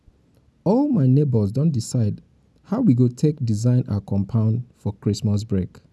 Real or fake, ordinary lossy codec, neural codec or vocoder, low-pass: real; none; none; none